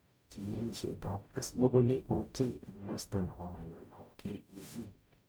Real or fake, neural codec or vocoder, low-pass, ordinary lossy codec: fake; codec, 44.1 kHz, 0.9 kbps, DAC; none; none